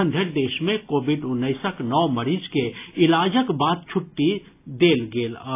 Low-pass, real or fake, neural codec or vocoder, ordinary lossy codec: 3.6 kHz; real; none; AAC, 24 kbps